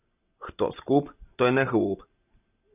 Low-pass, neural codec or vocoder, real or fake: 3.6 kHz; none; real